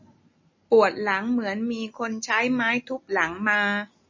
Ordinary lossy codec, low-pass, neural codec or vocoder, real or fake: MP3, 32 kbps; 7.2 kHz; none; real